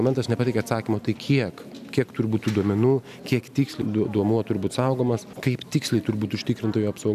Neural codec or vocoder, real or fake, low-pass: none; real; 14.4 kHz